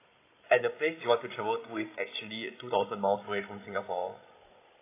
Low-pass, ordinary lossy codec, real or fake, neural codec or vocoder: 3.6 kHz; AAC, 24 kbps; fake; codec, 16 kHz, 16 kbps, FreqCodec, larger model